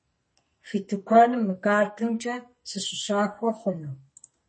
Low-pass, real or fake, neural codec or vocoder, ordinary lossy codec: 9.9 kHz; fake; codec, 44.1 kHz, 2.6 kbps, SNAC; MP3, 32 kbps